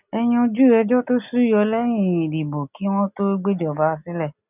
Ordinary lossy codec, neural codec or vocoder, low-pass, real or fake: none; none; 3.6 kHz; real